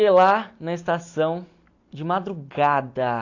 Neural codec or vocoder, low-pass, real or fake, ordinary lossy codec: none; 7.2 kHz; real; AAC, 48 kbps